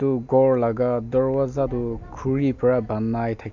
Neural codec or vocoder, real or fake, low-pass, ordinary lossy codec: none; real; 7.2 kHz; none